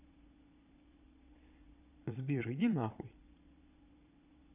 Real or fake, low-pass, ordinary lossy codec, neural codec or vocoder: fake; 3.6 kHz; none; vocoder, 22.05 kHz, 80 mel bands, Vocos